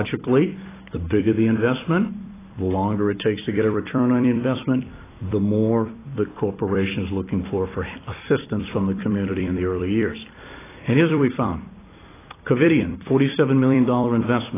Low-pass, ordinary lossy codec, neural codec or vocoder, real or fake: 3.6 kHz; AAC, 16 kbps; vocoder, 44.1 kHz, 128 mel bands every 256 samples, BigVGAN v2; fake